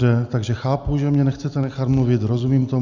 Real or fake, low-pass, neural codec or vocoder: real; 7.2 kHz; none